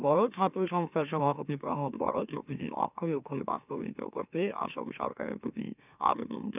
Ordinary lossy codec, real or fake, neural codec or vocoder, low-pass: none; fake; autoencoder, 44.1 kHz, a latent of 192 numbers a frame, MeloTTS; 3.6 kHz